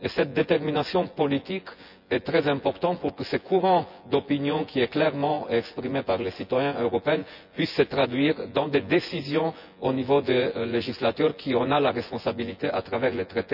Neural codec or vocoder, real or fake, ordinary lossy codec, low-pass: vocoder, 24 kHz, 100 mel bands, Vocos; fake; none; 5.4 kHz